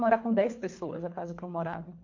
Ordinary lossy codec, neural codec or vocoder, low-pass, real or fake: MP3, 48 kbps; codec, 24 kHz, 3 kbps, HILCodec; 7.2 kHz; fake